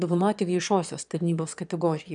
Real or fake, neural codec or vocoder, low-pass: fake; autoencoder, 22.05 kHz, a latent of 192 numbers a frame, VITS, trained on one speaker; 9.9 kHz